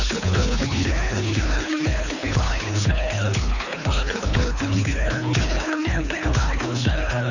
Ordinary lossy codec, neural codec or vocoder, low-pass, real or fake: none; codec, 24 kHz, 3 kbps, HILCodec; 7.2 kHz; fake